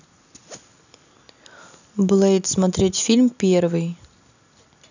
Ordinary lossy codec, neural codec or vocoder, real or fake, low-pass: none; none; real; 7.2 kHz